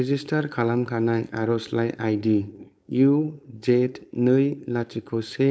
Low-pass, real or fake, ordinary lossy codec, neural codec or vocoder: none; fake; none; codec, 16 kHz, 4.8 kbps, FACodec